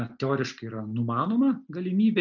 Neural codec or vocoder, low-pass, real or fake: none; 7.2 kHz; real